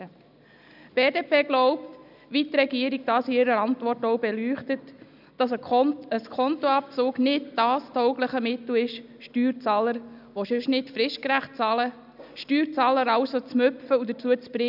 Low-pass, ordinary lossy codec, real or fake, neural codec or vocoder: 5.4 kHz; none; real; none